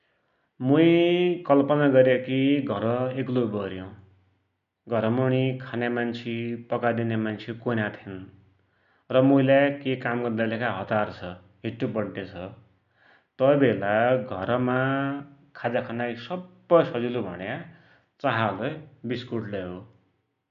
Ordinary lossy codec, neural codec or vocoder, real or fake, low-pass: none; none; real; 7.2 kHz